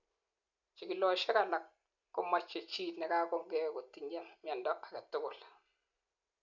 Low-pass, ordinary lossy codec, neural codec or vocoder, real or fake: 7.2 kHz; none; none; real